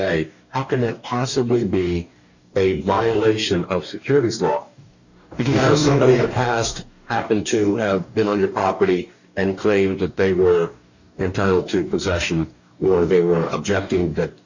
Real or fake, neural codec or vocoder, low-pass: fake; codec, 44.1 kHz, 2.6 kbps, DAC; 7.2 kHz